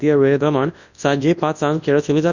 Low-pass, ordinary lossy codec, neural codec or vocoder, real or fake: 7.2 kHz; none; codec, 24 kHz, 0.9 kbps, WavTokenizer, large speech release; fake